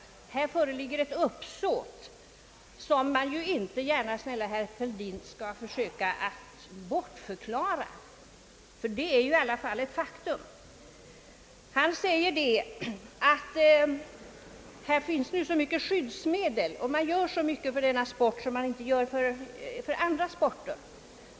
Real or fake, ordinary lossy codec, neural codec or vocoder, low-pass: real; none; none; none